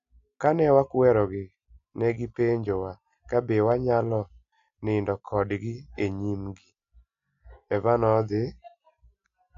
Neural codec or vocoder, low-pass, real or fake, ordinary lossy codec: none; 7.2 kHz; real; AAC, 64 kbps